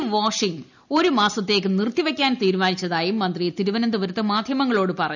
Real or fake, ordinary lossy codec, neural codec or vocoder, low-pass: real; none; none; 7.2 kHz